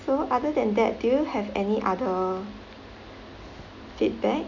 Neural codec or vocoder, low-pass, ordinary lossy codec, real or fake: none; 7.2 kHz; none; real